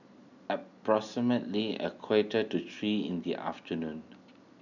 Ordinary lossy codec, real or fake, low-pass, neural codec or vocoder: none; real; 7.2 kHz; none